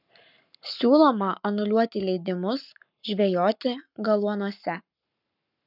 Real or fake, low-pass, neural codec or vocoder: fake; 5.4 kHz; codec, 44.1 kHz, 7.8 kbps, Pupu-Codec